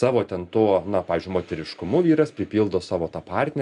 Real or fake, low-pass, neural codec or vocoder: real; 10.8 kHz; none